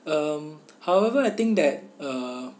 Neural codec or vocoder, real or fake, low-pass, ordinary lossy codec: none; real; none; none